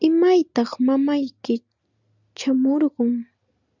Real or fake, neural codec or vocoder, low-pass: real; none; 7.2 kHz